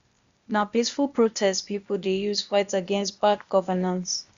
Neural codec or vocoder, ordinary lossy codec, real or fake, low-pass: codec, 16 kHz, 0.8 kbps, ZipCodec; Opus, 64 kbps; fake; 7.2 kHz